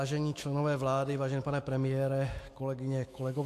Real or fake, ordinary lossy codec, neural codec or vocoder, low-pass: fake; AAC, 64 kbps; autoencoder, 48 kHz, 128 numbers a frame, DAC-VAE, trained on Japanese speech; 14.4 kHz